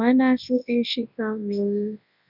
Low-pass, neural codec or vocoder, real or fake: 5.4 kHz; codec, 24 kHz, 0.9 kbps, WavTokenizer, large speech release; fake